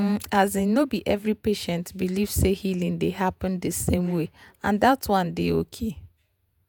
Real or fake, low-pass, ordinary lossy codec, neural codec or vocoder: fake; none; none; vocoder, 48 kHz, 128 mel bands, Vocos